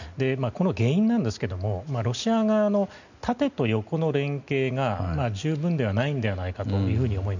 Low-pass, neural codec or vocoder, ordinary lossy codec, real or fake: 7.2 kHz; none; none; real